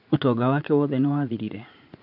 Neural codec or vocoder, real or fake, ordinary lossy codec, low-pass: vocoder, 44.1 kHz, 80 mel bands, Vocos; fake; none; 5.4 kHz